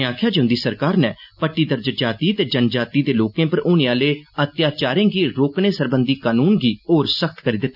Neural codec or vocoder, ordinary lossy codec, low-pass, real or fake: none; none; 5.4 kHz; real